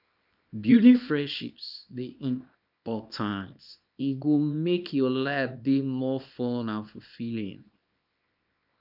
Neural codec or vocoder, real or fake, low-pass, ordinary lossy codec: codec, 24 kHz, 0.9 kbps, WavTokenizer, small release; fake; 5.4 kHz; none